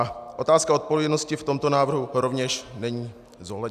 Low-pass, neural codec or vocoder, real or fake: 14.4 kHz; none; real